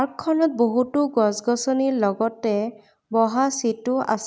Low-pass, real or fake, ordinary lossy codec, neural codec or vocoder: none; real; none; none